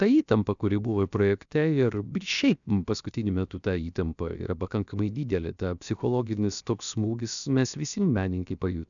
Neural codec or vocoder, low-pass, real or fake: codec, 16 kHz, 0.7 kbps, FocalCodec; 7.2 kHz; fake